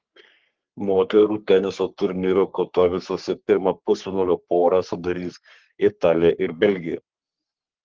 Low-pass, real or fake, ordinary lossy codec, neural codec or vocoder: 7.2 kHz; fake; Opus, 16 kbps; codec, 44.1 kHz, 3.4 kbps, Pupu-Codec